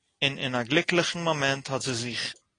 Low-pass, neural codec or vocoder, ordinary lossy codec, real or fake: 9.9 kHz; none; AAC, 32 kbps; real